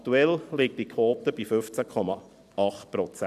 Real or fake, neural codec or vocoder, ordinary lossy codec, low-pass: fake; vocoder, 44.1 kHz, 128 mel bands every 256 samples, BigVGAN v2; none; 14.4 kHz